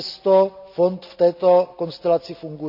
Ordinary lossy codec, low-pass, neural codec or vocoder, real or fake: none; 5.4 kHz; none; real